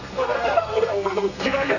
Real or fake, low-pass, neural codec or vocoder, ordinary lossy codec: fake; 7.2 kHz; codec, 32 kHz, 1.9 kbps, SNAC; none